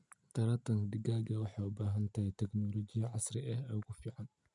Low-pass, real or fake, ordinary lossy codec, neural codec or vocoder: 10.8 kHz; real; none; none